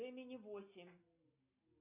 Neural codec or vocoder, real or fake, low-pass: none; real; 3.6 kHz